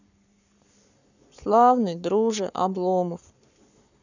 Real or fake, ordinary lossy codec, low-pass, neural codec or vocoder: fake; none; 7.2 kHz; codec, 44.1 kHz, 7.8 kbps, Pupu-Codec